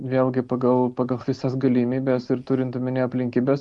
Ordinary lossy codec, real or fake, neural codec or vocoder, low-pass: Opus, 64 kbps; real; none; 10.8 kHz